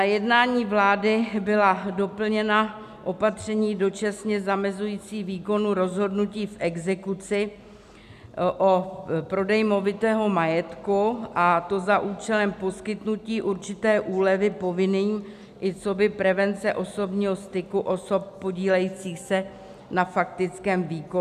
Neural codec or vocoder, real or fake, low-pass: none; real; 14.4 kHz